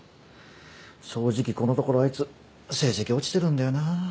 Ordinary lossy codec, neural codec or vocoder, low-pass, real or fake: none; none; none; real